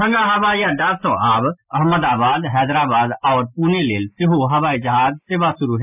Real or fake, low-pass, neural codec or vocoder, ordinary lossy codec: real; 3.6 kHz; none; none